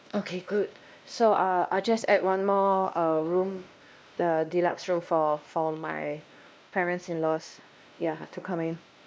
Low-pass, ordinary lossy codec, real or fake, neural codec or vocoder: none; none; fake; codec, 16 kHz, 1 kbps, X-Codec, WavLM features, trained on Multilingual LibriSpeech